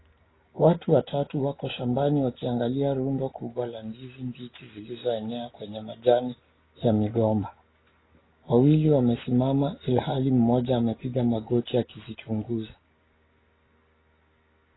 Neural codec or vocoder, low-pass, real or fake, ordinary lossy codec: none; 7.2 kHz; real; AAC, 16 kbps